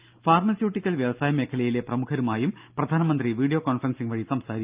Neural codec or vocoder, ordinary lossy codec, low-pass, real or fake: none; Opus, 32 kbps; 3.6 kHz; real